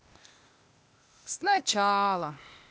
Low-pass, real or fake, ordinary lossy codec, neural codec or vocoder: none; fake; none; codec, 16 kHz, 0.8 kbps, ZipCodec